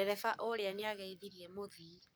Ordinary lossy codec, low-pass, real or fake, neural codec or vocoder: none; none; fake; codec, 44.1 kHz, 7.8 kbps, Pupu-Codec